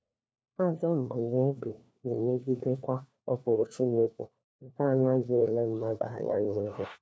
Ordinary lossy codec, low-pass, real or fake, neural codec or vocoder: none; none; fake; codec, 16 kHz, 1 kbps, FunCodec, trained on LibriTTS, 50 frames a second